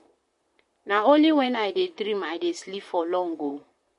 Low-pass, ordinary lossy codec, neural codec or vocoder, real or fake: 14.4 kHz; MP3, 48 kbps; vocoder, 44.1 kHz, 128 mel bands, Pupu-Vocoder; fake